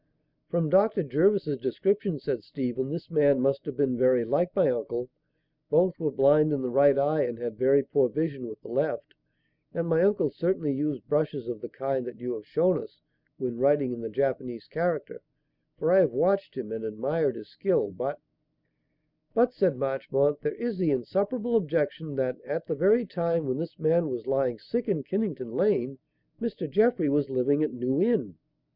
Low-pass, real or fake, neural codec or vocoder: 5.4 kHz; real; none